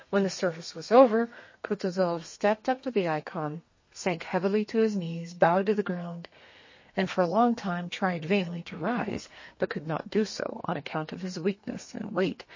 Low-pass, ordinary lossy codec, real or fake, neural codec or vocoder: 7.2 kHz; MP3, 32 kbps; fake; codec, 32 kHz, 1.9 kbps, SNAC